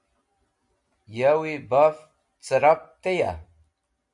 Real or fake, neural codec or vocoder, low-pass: real; none; 10.8 kHz